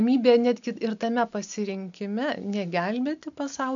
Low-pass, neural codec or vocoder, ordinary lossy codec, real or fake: 7.2 kHz; none; MP3, 96 kbps; real